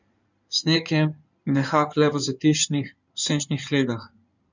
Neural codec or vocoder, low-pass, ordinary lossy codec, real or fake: codec, 16 kHz in and 24 kHz out, 2.2 kbps, FireRedTTS-2 codec; 7.2 kHz; none; fake